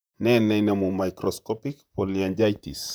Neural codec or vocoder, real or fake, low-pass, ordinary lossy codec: vocoder, 44.1 kHz, 128 mel bands, Pupu-Vocoder; fake; none; none